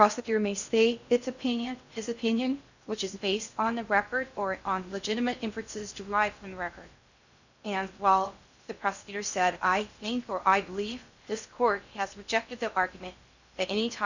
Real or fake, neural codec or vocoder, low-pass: fake; codec, 16 kHz in and 24 kHz out, 0.6 kbps, FocalCodec, streaming, 2048 codes; 7.2 kHz